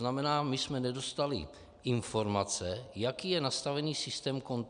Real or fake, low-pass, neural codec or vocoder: real; 9.9 kHz; none